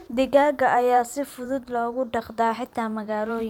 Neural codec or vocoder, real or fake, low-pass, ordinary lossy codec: vocoder, 44.1 kHz, 128 mel bands every 512 samples, BigVGAN v2; fake; 19.8 kHz; none